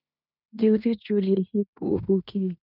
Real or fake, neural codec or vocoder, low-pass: fake; codec, 16 kHz in and 24 kHz out, 0.9 kbps, LongCat-Audio-Codec, fine tuned four codebook decoder; 5.4 kHz